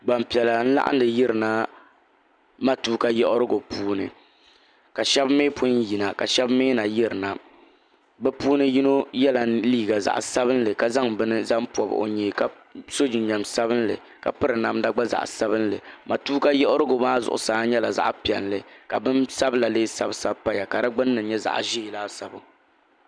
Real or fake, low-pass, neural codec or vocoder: real; 9.9 kHz; none